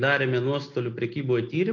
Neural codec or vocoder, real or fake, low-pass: none; real; 7.2 kHz